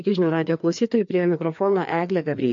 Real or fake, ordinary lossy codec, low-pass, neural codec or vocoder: fake; MP3, 48 kbps; 7.2 kHz; codec, 16 kHz, 2 kbps, FreqCodec, larger model